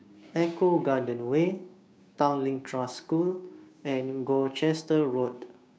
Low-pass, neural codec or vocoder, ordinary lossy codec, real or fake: none; codec, 16 kHz, 6 kbps, DAC; none; fake